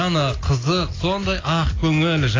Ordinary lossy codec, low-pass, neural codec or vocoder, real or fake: AAC, 32 kbps; 7.2 kHz; none; real